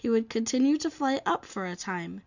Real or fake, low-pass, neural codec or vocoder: real; 7.2 kHz; none